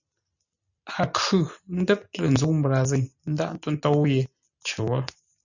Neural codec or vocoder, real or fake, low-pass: none; real; 7.2 kHz